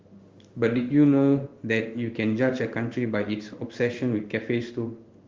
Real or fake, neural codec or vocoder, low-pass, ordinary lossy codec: fake; codec, 16 kHz in and 24 kHz out, 1 kbps, XY-Tokenizer; 7.2 kHz; Opus, 32 kbps